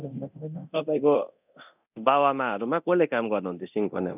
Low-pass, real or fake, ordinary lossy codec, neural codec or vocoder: 3.6 kHz; fake; none; codec, 24 kHz, 0.9 kbps, DualCodec